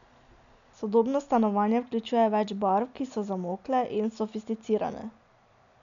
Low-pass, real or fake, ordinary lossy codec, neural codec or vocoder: 7.2 kHz; real; none; none